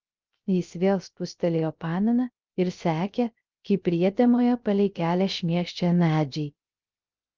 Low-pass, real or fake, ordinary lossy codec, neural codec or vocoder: 7.2 kHz; fake; Opus, 24 kbps; codec, 16 kHz, 0.3 kbps, FocalCodec